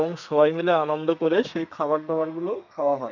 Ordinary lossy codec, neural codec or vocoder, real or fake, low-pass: none; codec, 32 kHz, 1.9 kbps, SNAC; fake; 7.2 kHz